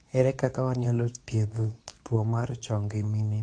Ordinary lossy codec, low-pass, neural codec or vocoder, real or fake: AAC, 48 kbps; 9.9 kHz; codec, 24 kHz, 0.9 kbps, WavTokenizer, medium speech release version 2; fake